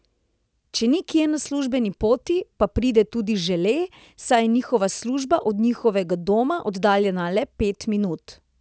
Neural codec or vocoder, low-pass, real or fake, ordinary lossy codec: none; none; real; none